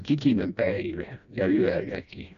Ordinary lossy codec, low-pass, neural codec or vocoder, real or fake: none; 7.2 kHz; codec, 16 kHz, 1 kbps, FreqCodec, smaller model; fake